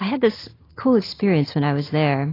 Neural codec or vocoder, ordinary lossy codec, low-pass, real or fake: none; AAC, 24 kbps; 5.4 kHz; real